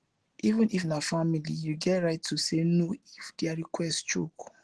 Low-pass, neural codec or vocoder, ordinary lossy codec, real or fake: 10.8 kHz; none; Opus, 16 kbps; real